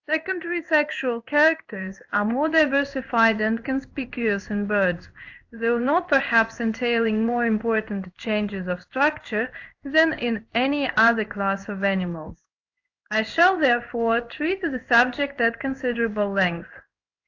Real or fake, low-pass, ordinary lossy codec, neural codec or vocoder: fake; 7.2 kHz; AAC, 48 kbps; codec, 16 kHz in and 24 kHz out, 1 kbps, XY-Tokenizer